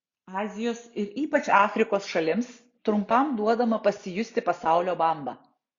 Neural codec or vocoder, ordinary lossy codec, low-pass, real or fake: none; Opus, 64 kbps; 7.2 kHz; real